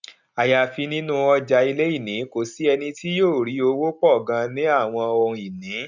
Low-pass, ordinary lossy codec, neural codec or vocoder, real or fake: 7.2 kHz; none; none; real